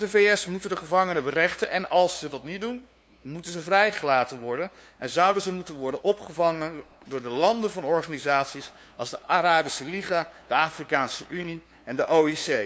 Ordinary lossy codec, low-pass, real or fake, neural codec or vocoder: none; none; fake; codec, 16 kHz, 2 kbps, FunCodec, trained on LibriTTS, 25 frames a second